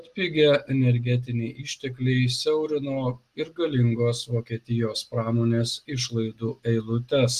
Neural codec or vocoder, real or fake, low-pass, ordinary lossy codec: none; real; 14.4 kHz; Opus, 24 kbps